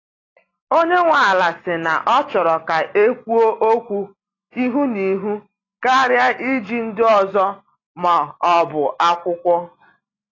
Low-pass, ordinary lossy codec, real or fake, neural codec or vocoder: 7.2 kHz; AAC, 32 kbps; real; none